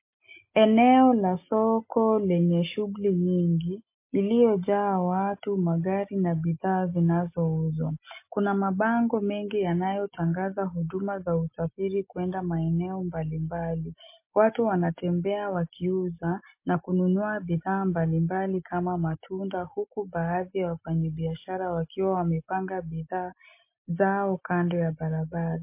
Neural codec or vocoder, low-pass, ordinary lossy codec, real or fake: none; 3.6 kHz; MP3, 24 kbps; real